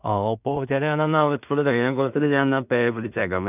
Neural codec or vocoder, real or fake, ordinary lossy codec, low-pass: codec, 16 kHz in and 24 kHz out, 0.4 kbps, LongCat-Audio-Codec, two codebook decoder; fake; none; 3.6 kHz